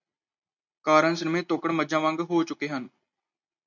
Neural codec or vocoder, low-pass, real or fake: none; 7.2 kHz; real